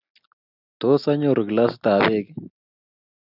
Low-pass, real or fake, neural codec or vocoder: 5.4 kHz; real; none